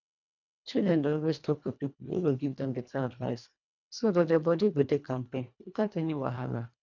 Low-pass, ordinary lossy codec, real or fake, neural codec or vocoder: 7.2 kHz; none; fake; codec, 24 kHz, 1.5 kbps, HILCodec